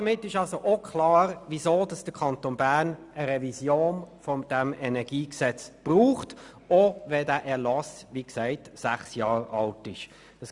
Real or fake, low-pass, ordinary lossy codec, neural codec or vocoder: real; 10.8 kHz; Opus, 64 kbps; none